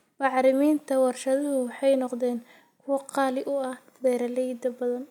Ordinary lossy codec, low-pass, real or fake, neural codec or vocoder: none; 19.8 kHz; real; none